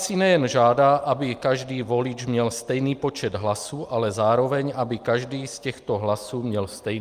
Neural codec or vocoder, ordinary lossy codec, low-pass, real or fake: none; Opus, 32 kbps; 14.4 kHz; real